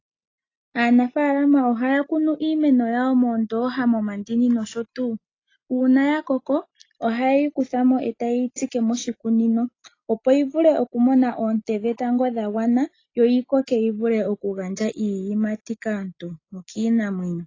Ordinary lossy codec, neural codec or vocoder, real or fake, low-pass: AAC, 32 kbps; none; real; 7.2 kHz